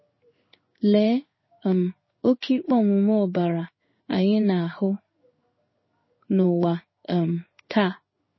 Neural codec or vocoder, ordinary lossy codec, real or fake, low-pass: codec, 16 kHz in and 24 kHz out, 1 kbps, XY-Tokenizer; MP3, 24 kbps; fake; 7.2 kHz